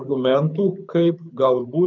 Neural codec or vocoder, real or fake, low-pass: codec, 24 kHz, 6 kbps, HILCodec; fake; 7.2 kHz